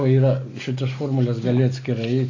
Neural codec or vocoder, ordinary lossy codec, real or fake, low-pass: none; AAC, 32 kbps; real; 7.2 kHz